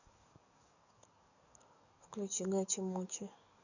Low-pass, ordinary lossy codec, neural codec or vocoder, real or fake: 7.2 kHz; none; codec, 44.1 kHz, 7.8 kbps, DAC; fake